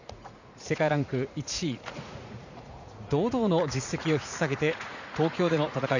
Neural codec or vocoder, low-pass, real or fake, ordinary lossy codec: vocoder, 44.1 kHz, 80 mel bands, Vocos; 7.2 kHz; fake; none